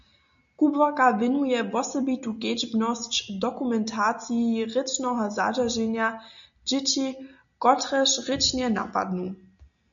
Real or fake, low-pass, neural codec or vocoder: real; 7.2 kHz; none